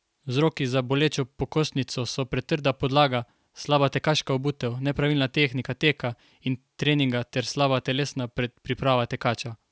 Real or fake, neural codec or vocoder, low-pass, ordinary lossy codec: real; none; none; none